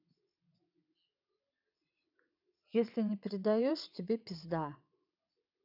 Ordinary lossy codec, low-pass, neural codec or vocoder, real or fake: none; 5.4 kHz; vocoder, 22.05 kHz, 80 mel bands, WaveNeXt; fake